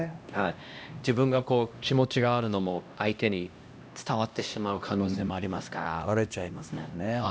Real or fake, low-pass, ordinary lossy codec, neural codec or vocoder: fake; none; none; codec, 16 kHz, 1 kbps, X-Codec, HuBERT features, trained on LibriSpeech